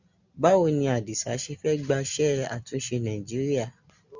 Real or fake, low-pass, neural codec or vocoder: real; 7.2 kHz; none